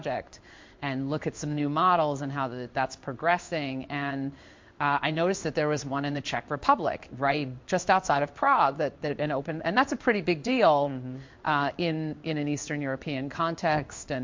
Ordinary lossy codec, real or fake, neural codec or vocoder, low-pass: MP3, 48 kbps; fake; codec, 16 kHz in and 24 kHz out, 1 kbps, XY-Tokenizer; 7.2 kHz